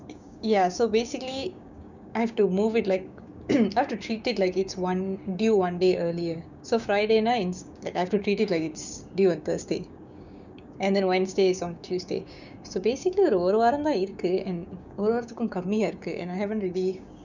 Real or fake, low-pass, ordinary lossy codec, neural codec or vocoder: fake; 7.2 kHz; none; codec, 16 kHz, 6 kbps, DAC